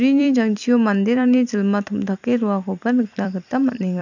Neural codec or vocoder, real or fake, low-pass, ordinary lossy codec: vocoder, 44.1 kHz, 128 mel bands every 256 samples, BigVGAN v2; fake; 7.2 kHz; none